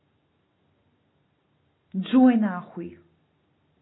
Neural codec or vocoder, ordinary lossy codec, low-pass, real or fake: none; AAC, 16 kbps; 7.2 kHz; real